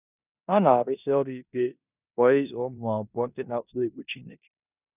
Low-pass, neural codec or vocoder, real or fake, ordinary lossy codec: 3.6 kHz; codec, 16 kHz in and 24 kHz out, 0.9 kbps, LongCat-Audio-Codec, four codebook decoder; fake; none